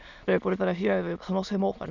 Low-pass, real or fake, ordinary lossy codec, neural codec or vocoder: 7.2 kHz; fake; none; autoencoder, 22.05 kHz, a latent of 192 numbers a frame, VITS, trained on many speakers